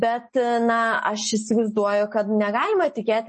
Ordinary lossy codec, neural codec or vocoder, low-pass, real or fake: MP3, 32 kbps; none; 10.8 kHz; real